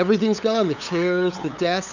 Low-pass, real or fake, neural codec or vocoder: 7.2 kHz; fake; codec, 16 kHz, 8 kbps, FunCodec, trained on LibriTTS, 25 frames a second